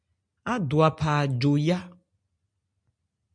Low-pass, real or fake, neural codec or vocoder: 9.9 kHz; real; none